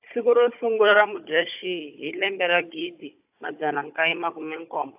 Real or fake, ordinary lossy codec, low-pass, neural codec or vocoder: fake; AAC, 32 kbps; 3.6 kHz; codec, 16 kHz, 16 kbps, FunCodec, trained on Chinese and English, 50 frames a second